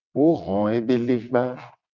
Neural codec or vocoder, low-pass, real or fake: vocoder, 22.05 kHz, 80 mel bands, WaveNeXt; 7.2 kHz; fake